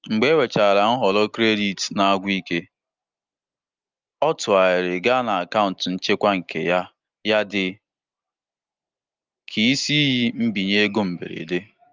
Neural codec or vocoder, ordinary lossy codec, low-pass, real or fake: none; Opus, 32 kbps; 7.2 kHz; real